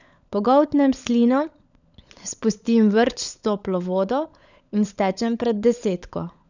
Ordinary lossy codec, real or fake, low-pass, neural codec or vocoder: none; fake; 7.2 kHz; codec, 16 kHz, 16 kbps, FunCodec, trained on LibriTTS, 50 frames a second